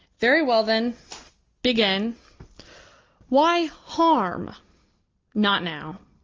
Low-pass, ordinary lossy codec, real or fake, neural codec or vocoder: 7.2 kHz; Opus, 32 kbps; real; none